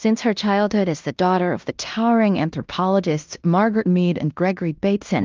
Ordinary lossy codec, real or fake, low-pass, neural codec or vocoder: Opus, 24 kbps; fake; 7.2 kHz; codec, 16 kHz in and 24 kHz out, 0.9 kbps, LongCat-Audio-Codec, fine tuned four codebook decoder